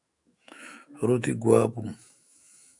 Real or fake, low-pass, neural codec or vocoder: fake; 10.8 kHz; autoencoder, 48 kHz, 128 numbers a frame, DAC-VAE, trained on Japanese speech